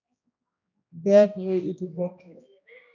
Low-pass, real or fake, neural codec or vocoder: 7.2 kHz; fake; codec, 16 kHz, 1 kbps, X-Codec, HuBERT features, trained on general audio